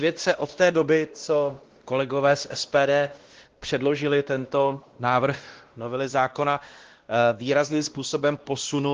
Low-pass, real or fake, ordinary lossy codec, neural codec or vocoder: 7.2 kHz; fake; Opus, 16 kbps; codec, 16 kHz, 1 kbps, X-Codec, WavLM features, trained on Multilingual LibriSpeech